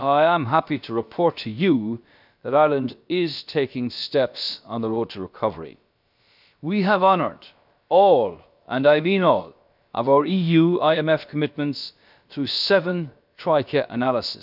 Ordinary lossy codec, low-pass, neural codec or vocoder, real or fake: none; 5.4 kHz; codec, 16 kHz, about 1 kbps, DyCAST, with the encoder's durations; fake